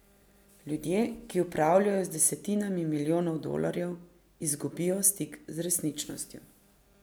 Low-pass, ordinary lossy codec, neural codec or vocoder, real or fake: none; none; none; real